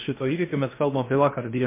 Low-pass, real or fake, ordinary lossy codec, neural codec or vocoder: 3.6 kHz; fake; MP3, 24 kbps; codec, 16 kHz in and 24 kHz out, 0.6 kbps, FocalCodec, streaming, 2048 codes